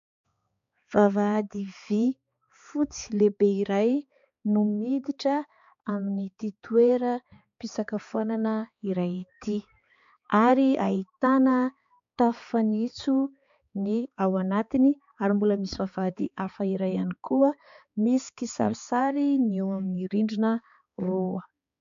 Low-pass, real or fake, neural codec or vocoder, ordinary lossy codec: 7.2 kHz; fake; codec, 16 kHz, 4 kbps, X-Codec, HuBERT features, trained on balanced general audio; MP3, 64 kbps